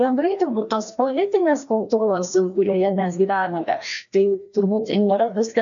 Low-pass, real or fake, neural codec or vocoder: 7.2 kHz; fake; codec, 16 kHz, 1 kbps, FreqCodec, larger model